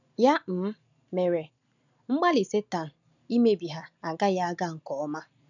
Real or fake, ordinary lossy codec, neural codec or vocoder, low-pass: real; none; none; 7.2 kHz